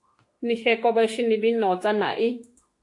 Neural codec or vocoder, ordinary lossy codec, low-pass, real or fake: autoencoder, 48 kHz, 32 numbers a frame, DAC-VAE, trained on Japanese speech; AAC, 48 kbps; 10.8 kHz; fake